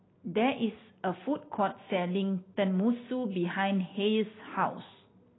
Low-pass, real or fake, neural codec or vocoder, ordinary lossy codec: 7.2 kHz; real; none; AAC, 16 kbps